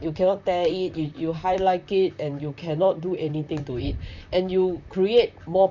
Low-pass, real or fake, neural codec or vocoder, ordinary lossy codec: 7.2 kHz; fake; vocoder, 44.1 kHz, 128 mel bands, Pupu-Vocoder; none